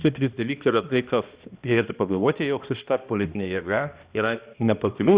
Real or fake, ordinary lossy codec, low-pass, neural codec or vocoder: fake; Opus, 64 kbps; 3.6 kHz; codec, 16 kHz, 1 kbps, X-Codec, HuBERT features, trained on balanced general audio